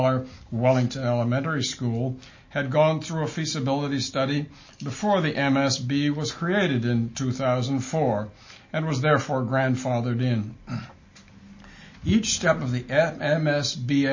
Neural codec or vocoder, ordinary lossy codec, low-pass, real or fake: none; MP3, 32 kbps; 7.2 kHz; real